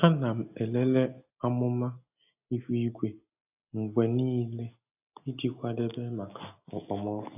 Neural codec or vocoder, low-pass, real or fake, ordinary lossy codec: none; 3.6 kHz; real; AAC, 32 kbps